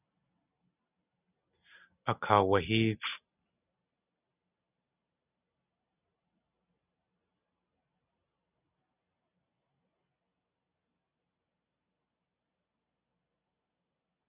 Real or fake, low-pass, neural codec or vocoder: real; 3.6 kHz; none